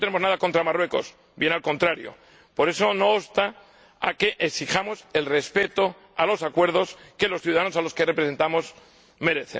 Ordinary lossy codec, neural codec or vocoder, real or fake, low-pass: none; none; real; none